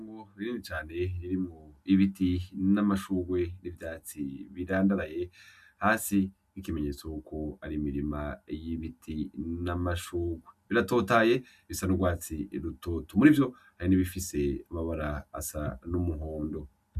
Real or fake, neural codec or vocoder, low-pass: real; none; 14.4 kHz